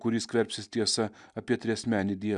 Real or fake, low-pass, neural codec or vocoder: real; 10.8 kHz; none